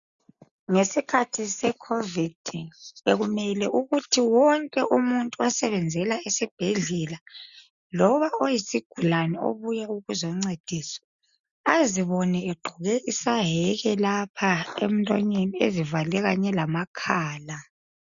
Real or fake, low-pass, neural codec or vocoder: real; 7.2 kHz; none